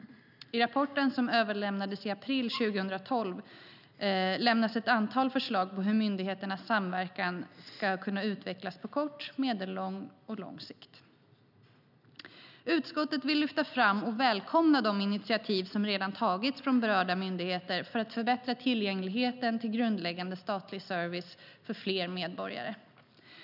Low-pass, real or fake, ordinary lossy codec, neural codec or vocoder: 5.4 kHz; real; none; none